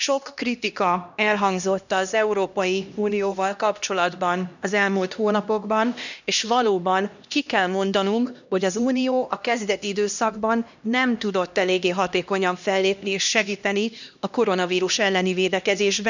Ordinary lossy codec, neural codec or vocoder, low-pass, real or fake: none; codec, 16 kHz, 1 kbps, X-Codec, HuBERT features, trained on LibriSpeech; 7.2 kHz; fake